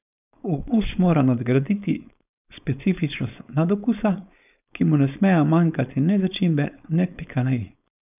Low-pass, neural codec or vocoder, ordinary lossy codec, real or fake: 3.6 kHz; codec, 16 kHz, 4.8 kbps, FACodec; none; fake